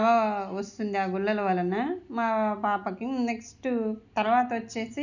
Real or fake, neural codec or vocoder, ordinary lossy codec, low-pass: real; none; none; 7.2 kHz